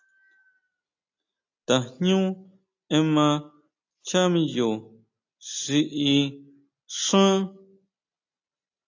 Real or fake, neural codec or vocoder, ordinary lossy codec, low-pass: real; none; AAC, 48 kbps; 7.2 kHz